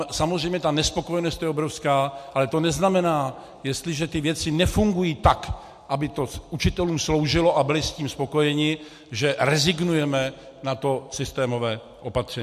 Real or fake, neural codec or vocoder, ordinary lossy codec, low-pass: real; none; MP3, 64 kbps; 14.4 kHz